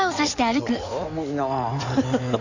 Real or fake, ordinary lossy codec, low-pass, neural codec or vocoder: fake; none; 7.2 kHz; codec, 16 kHz in and 24 kHz out, 2.2 kbps, FireRedTTS-2 codec